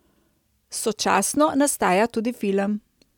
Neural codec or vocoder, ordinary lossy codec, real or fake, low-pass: none; none; real; 19.8 kHz